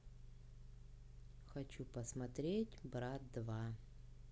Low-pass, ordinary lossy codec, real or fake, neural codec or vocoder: none; none; real; none